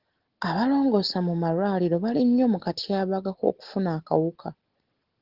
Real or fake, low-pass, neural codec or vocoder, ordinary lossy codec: real; 5.4 kHz; none; Opus, 16 kbps